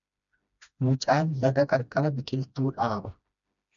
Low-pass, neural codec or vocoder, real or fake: 7.2 kHz; codec, 16 kHz, 1 kbps, FreqCodec, smaller model; fake